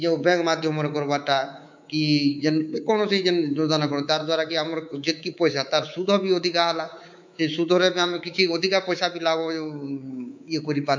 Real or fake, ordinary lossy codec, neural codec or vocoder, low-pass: fake; MP3, 64 kbps; codec, 24 kHz, 3.1 kbps, DualCodec; 7.2 kHz